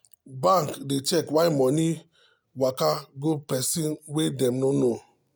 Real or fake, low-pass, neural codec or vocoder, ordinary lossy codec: real; none; none; none